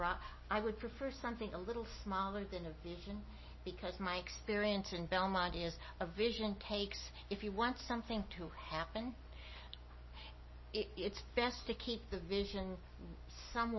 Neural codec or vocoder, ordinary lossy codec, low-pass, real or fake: none; MP3, 24 kbps; 7.2 kHz; real